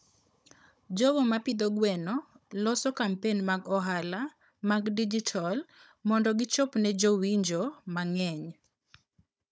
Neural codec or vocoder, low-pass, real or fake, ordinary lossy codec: codec, 16 kHz, 16 kbps, FunCodec, trained on Chinese and English, 50 frames a second; none; fake; none